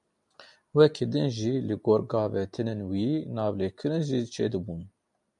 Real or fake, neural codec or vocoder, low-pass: real; none; 10.8 kHz